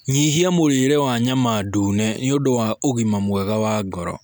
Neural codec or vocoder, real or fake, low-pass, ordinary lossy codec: none; real; none; none